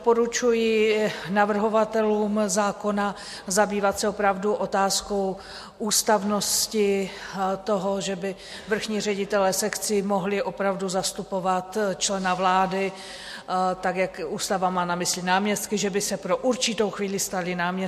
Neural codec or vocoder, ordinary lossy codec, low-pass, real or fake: none; MP3, 64 kbps; 14.4 kHz; real